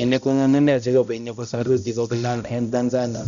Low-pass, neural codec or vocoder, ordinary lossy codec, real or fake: 7.2 kHz; codec, 16 kHz, 0.5 kbps, X-Codec, HuBERT features, trained on balanced general audio; none; fake